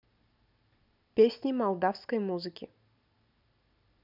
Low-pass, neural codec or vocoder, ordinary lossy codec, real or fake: 5.4 kHz; none; none; real